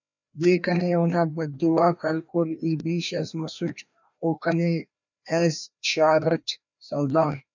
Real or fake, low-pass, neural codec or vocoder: fake; 7.2 kHz; codec, 16 kHz, 1 kbps, FreqCodec, larger model